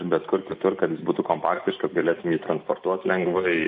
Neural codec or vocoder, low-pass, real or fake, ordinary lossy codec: none; 9.9 kHz; real; MP3, 32 kbps